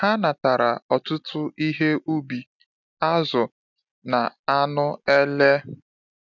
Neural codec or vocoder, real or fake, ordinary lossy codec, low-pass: none; real; none; 7.2 kHz